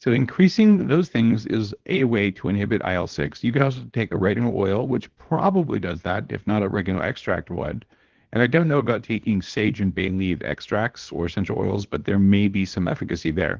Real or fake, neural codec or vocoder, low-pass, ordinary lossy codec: fake; codec, 24 kHz, 0.9 kbps, WavTokenizer, small release; 7.2 kHz; Opus, 16 kbps